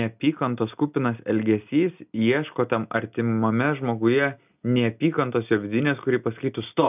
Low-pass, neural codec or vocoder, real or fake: 3.6 kHz; none; real